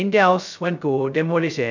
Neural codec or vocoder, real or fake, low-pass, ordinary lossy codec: codec, 16 kHz, 0.2 kbps, FocalCodec; fake; 7.2 kHz; none